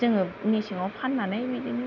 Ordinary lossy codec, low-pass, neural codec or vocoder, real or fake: none; 7.2 kHz; none; real